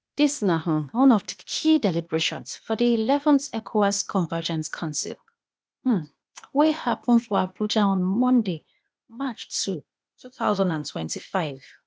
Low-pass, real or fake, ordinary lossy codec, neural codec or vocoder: none; fake; none; codec, 16 kHz, 0.8 kbps, ZipCodec